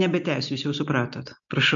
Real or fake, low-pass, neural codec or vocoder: real; 7.2 kHz; none